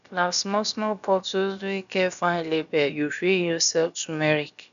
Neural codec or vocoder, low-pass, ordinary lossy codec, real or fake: codec, 16 kHz, about 1 kbps, DyCAST, with the encoder's durations; 7.2 kHz; none; fake